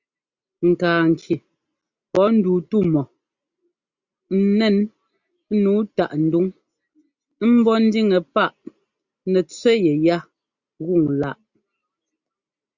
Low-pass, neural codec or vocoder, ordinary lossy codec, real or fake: 7.2 kHz; none; Opus, 64 kbps; real